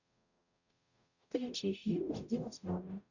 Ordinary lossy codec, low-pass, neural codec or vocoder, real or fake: none; 7.2 kHz; codec, 44.1 kHz, 0.9 kbps, DAC; fake